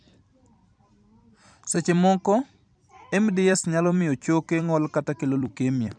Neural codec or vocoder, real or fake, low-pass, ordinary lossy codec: vocoder, 44.1 kHz, 128 mel bands every 256 samples, BigVGAN v2; fake; 9.9 kHz; none